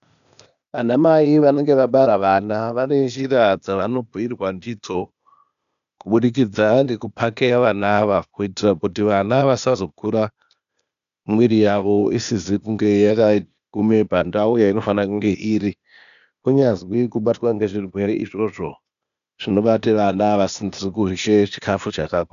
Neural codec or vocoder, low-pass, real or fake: codec, 16 kHz, 0.8 kbps, ZipCodec; 7.2 kHz; fake